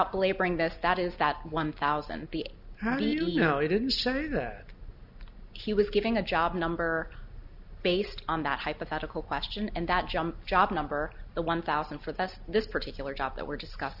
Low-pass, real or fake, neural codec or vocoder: 5.4 kHz; real; none